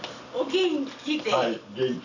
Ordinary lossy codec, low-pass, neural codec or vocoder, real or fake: none; 7.2 kHz; none; real